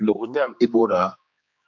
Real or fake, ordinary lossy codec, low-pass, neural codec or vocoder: fake; MP3, 64 kbps; 7.2 kHz; codec, 16 kHz, 2 kbps, X-Codec, HuBERT features, trained on general audio